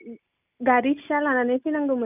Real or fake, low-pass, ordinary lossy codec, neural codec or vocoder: real; 3.6 kHz; none; none